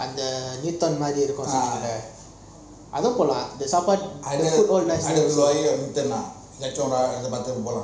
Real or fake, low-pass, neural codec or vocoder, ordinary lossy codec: real; none; none; none